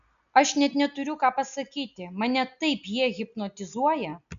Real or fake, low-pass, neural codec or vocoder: real; 7.2 kHz; none